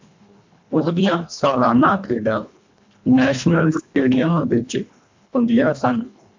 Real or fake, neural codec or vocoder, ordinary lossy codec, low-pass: fake; codec, 24 kHz, 1.5 kbps, HILCodec; MP3, 64 kbps; 7.2 kHz